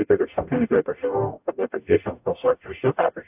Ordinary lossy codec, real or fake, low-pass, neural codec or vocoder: AAC, 32 kbps; fake; 3.6 kHz; codec, 44.1 kHz, 0.9 kbps, DAC